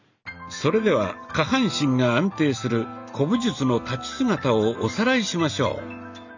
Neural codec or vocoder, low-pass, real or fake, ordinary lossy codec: none; 7.2 kHz; real; none